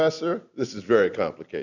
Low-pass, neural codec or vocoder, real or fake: 7.2 kHz; none; real